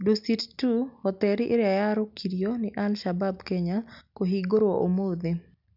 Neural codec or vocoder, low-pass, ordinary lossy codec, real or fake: none; 5.4 kHz; none; real